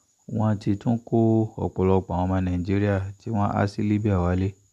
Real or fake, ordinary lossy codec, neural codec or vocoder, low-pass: real; AAC, 96 kbps; none; 14.4 kHz